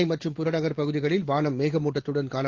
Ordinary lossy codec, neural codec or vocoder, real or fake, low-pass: Opus, 16 kbps; none; real; 7.2 kHz